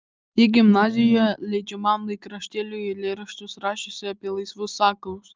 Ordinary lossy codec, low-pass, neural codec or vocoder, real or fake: Opus, 24 kbps; 7.2 kHz; none; real